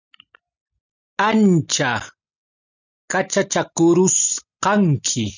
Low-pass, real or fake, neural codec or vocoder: 7.2 kHz; real; none